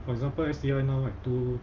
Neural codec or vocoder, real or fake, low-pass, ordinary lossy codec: none; real; 7.2 kHz; Opus, 24 kbps